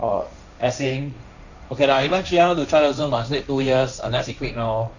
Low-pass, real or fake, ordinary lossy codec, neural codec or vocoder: 7.2 kHz; fake; none; codec, 16 kHz, 1.1 kbps, Voila-Tokenizer